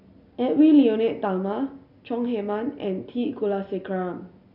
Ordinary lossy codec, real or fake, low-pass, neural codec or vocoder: none; real; 5.4 kHz; none